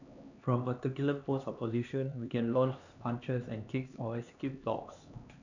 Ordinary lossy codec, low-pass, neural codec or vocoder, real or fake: none; 7.2 kHz; codec, 16 kHz, 2 kbps, X-Codec, HuBERT features, trained on LibriSpeech; fake